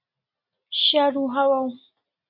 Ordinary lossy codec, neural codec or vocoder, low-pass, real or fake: MP3, 48 kbps; none; 5.4 kHz; real